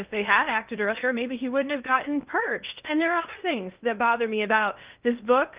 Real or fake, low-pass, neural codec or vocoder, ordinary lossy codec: fake; 3.6 kHz; codec, 16 kHz in and 24 kHz out, 0.8 kbps, FocalCodec, streaming, 65536 codes; Opus, 24 kbps